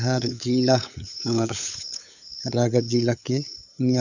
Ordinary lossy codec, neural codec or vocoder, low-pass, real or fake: none; codec, 16 kHz, 8 kbps, FunCodec, trained on LibriTTS, 25 frames a second; 7.2 kHz; fake